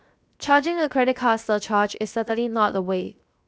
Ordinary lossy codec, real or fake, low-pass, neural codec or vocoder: none; fake; none; codec, 16 kHz, 0.7 kbps, FocalCodec